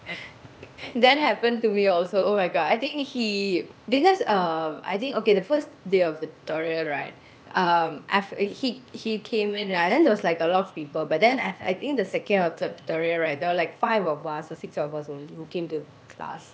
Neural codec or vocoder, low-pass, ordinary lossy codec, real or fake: codec, 16 kHz, 0.8 kbps, ZipCodec; none; none; fake